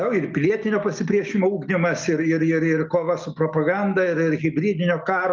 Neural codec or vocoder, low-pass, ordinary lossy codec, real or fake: none; 7.2 kHz; Opus, 24 kbps; real